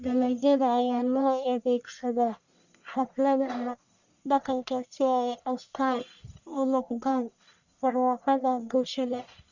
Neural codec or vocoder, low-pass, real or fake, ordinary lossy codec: codec, 44.1 kHz, 1.7 kbps, Pupu-Codec; 7.2 kHz; fake; none